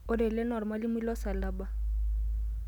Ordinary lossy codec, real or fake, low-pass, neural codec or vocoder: none; real; 19.8 kHz; none